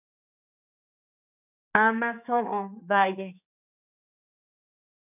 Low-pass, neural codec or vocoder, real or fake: 3.6 kHz; codec, 16 kHz, 4 kbps, X-Codec, HuBERT features, trained on general audio; fake